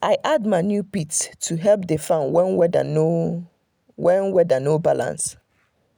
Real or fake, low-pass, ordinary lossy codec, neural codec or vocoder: real; none; none; none